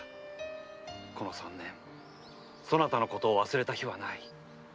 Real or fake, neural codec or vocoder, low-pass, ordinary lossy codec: real; none; none; none